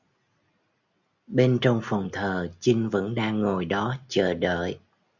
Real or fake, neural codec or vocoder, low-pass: real; none; 7.2 kHz